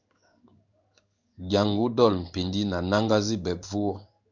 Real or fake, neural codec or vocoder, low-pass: fake; codec, 16 kHz in and 24 kHz out, 1 kbps, XY-Tokenizer; 7.2 kHz